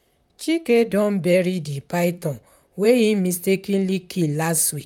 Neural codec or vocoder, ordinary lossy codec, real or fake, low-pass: vocoder, 44.1 kHz, 128 mel bands, Pupu-Vocoder; none; fake; 19.8 kHz